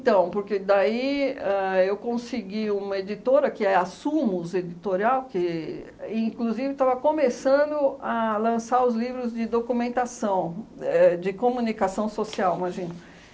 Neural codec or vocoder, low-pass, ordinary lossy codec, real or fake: none; none; none; real